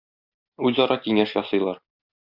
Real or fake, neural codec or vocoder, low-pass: real; none; 5.4 kHz